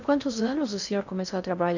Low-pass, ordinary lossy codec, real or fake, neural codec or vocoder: 7.2 kHz; none; fake; codec, 16 kHz in and 24 kHz out, 0.6 kbps, FocalCodec, streaming, 2048 codes